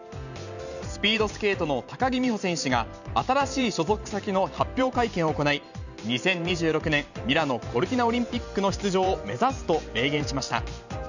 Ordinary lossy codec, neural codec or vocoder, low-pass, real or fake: none; vocoder, 44.1 kHz, 128 mel bands every 512 samples, BigVGAN v2; 7.2 kHz; fake